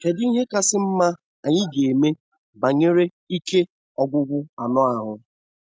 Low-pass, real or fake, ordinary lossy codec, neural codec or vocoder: none; real; none; none